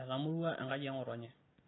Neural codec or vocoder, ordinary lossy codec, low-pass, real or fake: none; AAC, 16 kbps; 7.2 kHz; real